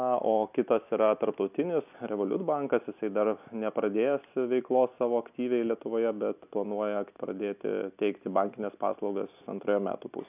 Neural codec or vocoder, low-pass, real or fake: none; 3.6 kHz; real